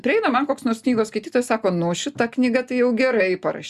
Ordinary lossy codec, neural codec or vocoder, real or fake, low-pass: Opus, 64 kbps; none; real; 14.4 kHz